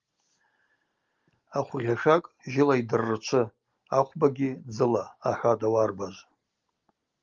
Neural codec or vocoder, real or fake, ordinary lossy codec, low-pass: none; real; Opus, 32 kbps; 7.2 kHz